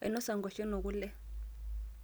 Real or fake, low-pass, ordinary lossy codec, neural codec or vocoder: real; none; none; none